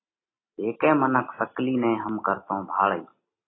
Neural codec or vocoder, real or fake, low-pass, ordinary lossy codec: none; real; 7.2 kHz; AAC, 16 kbps